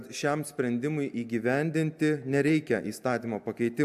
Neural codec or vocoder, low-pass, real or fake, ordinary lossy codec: none; 14.4 kHz; real; AAC, 96 kbps